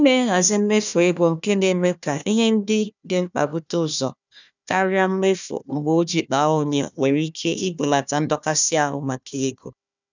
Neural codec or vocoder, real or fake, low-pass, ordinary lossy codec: codec, 16 kHz, 1 kbps, FunCodec, trained on Chinese and English, 50 frames a second; fake; 7.2 kHz; none